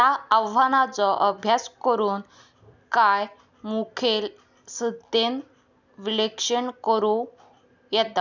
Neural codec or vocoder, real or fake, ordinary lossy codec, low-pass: none; real; none; 7.2 kHz